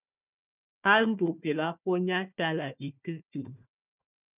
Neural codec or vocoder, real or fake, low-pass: codec, 16 kHz, 1 kbps, FunCodec, trained on Chinese and English, 50 frames a second; fake; 3.6 kHz